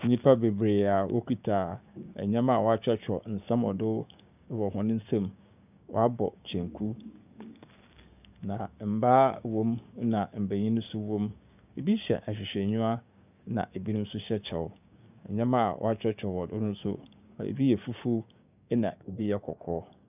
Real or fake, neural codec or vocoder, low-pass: fake; codec, 16 kHz, 6 kbps, DAC; 3.6 kHz